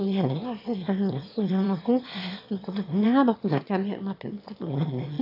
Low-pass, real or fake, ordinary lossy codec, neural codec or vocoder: 5.4 kHz; fake; AAC, 32 kbps; autoencoder, 22.05 kHz, a latent of 192 numbers a frame, VITS, trained on one speaker